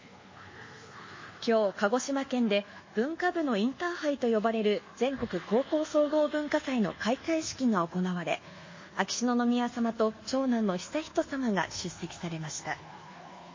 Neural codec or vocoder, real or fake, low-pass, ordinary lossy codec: codec, 24 kHz, 1.2 kbps, DualCodec; fake; 7.2 kHz; MP3, 32 kbps